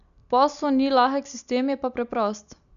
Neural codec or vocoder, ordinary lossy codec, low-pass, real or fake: none; none; 7.2 kHz; real